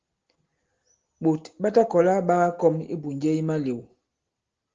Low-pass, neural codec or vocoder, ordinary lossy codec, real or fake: 7.2 kHz; none; Opus, 16 kbps; real